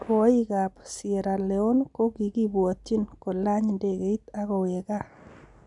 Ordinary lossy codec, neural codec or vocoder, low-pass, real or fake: none; none; 10.8 kHz; real